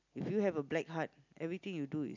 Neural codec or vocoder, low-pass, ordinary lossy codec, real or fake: none; 7.2 kHz; none; real